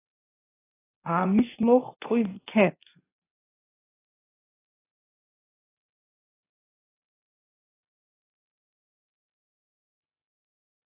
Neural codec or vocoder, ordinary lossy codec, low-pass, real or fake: codec, 24 kHz, 0.9 kbps, WavTokenizer, medium speech release version 2; AAC, 16 kbps; 3.6 kHz; fake